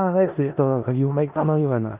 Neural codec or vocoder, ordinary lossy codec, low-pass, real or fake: codec, 16 kHz in and 24 kHz out, 0.4 kbps, LongCat-Audio-Codec, four codebook decoder; Opus, 32 kbps; 3.6 kHz; fake